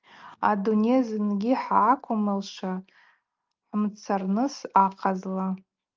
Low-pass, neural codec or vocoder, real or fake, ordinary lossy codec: 7.2 kHz; none; real; Opus, 32 kbps